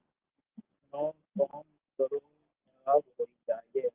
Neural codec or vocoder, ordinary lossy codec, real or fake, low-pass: none; Opus, 32 kbps; real; 3.6 kHz